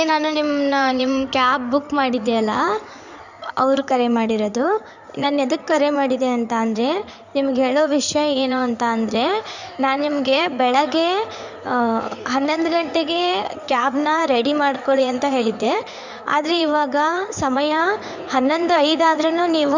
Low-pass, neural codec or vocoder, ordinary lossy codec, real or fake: 7.2 kHz; codec, 16 kHz in and 24 kHz out, 2.2 kbps, FireRedTTS-2 codec; none; fake